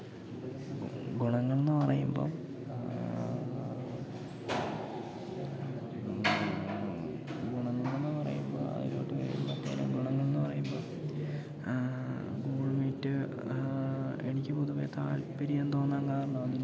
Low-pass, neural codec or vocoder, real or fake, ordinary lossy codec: none; none; real; none